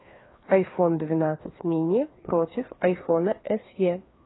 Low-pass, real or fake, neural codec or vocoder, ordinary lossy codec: 7.2 kHz; fake; codec, 16 kHz, 2 kbps, FreqCodec, larger model; AAC, 16 kbps